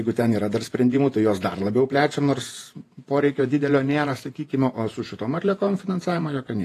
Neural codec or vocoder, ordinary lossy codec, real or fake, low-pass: vocoder, 48 kHz, 128 mel bands, Vocos; AAC, 48 kbps; fake; 14.4 kHz